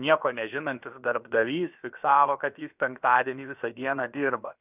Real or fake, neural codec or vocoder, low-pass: fake; codec, 16 kHz, about 1 kbps, DyCAST, with the encoder's durations; 3.6 kHz